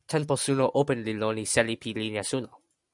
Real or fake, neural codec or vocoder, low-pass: real; none; 10.8 kHz